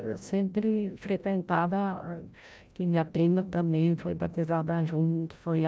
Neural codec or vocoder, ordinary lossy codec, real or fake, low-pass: codec, 16 kHz, 0.5 kbps, FreqCodec, larger model; none; fake; none